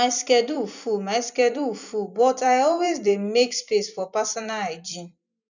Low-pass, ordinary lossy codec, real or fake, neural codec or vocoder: 7.2 kHz; none; fake; vocoder, 44.1 kHz, 128 mel bands every 256 samples, BigVGAN v2